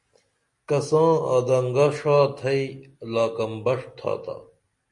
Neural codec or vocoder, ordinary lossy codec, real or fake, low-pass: none; MP3, 48 kbps; real; 10.8 kHz